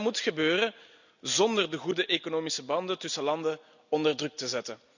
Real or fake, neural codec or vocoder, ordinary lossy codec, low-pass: real; none; none; 7.2 kHz